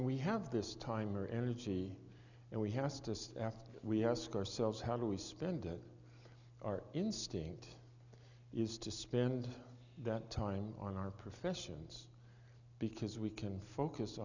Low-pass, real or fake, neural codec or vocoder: 7.2 kHz; real; none